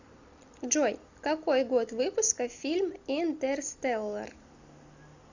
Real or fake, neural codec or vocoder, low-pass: real; none; 7.2 kHz